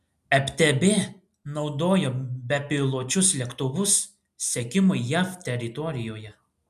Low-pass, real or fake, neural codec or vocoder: 14.4 kHz; real; none